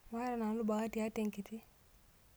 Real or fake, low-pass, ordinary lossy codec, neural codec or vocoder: real; none; none; none